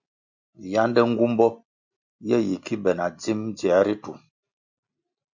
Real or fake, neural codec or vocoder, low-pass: real; none; 7.2 kHz